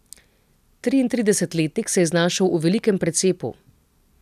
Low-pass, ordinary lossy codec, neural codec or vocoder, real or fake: 14.4 kHz; none; none; real